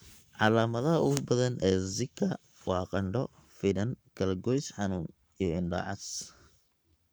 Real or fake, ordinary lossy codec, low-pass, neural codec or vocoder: fake; none; none; codec, 44.1 kHz, 7.8 kbps, Pupu-Codec